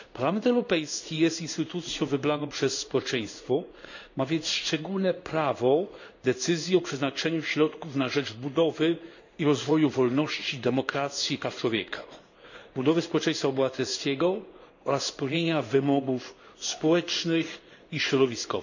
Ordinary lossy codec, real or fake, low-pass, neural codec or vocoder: none; fake; 7.2 kHz; codec, 16 kHz in and 24 kHz out, 1 kbps, XY-Tokenizer